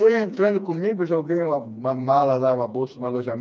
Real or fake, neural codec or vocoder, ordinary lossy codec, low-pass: fake; codec, 16 kHz, 2 kbps, FreqCodec, smaller model; none; none